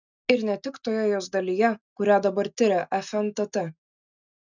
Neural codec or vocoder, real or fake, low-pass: none; real; 7.2 kHz